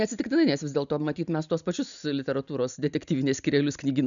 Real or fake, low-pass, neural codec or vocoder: real; 7.2 kHz; none